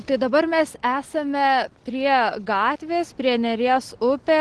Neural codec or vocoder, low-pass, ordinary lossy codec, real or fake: none; 10.8 kHz; Opus, 16 kbps; real